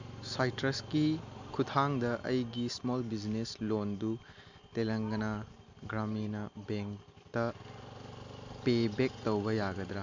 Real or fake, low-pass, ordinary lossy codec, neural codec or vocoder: real; 7.2 kHz; MP3, 64 kbps; none